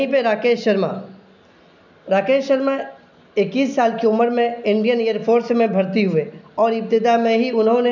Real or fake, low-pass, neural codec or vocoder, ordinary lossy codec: real; 7.2 kHz; none; none